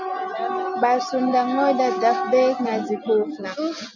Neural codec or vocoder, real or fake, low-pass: none; real; 7.2 kHz